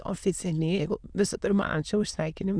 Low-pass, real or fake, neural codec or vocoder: 9.9 kHz; fake; autoencoder, 22.05 kHz, a latent of 192 numbers a frame, VITS, trained on many speakers